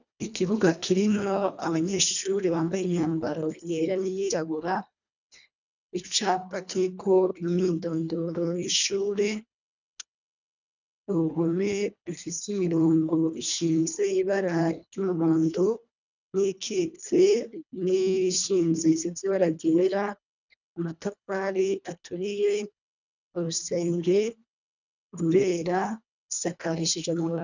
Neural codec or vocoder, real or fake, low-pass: codec, 24 kHz, 1.5 kbps, HILCodec; fake; 7.2 kHz